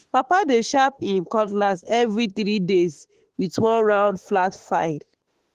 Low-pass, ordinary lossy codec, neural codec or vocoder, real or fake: 14.4 kHz; Opus, 16 kbps; autoencoder, 48 kHz, 32 numbers a frame, DAC-VAE, trained on Japanese speech; fake